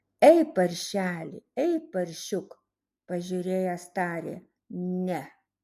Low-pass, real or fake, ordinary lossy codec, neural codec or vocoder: 14.4 kHz; real; MP3, 64 kbps; none